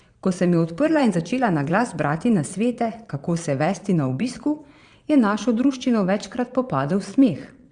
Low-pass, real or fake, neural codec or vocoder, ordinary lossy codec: 9.9 kHz; fake; vocoder, 22.05 kHz, 80 mel bands, Vocos; Opus, 64 kbps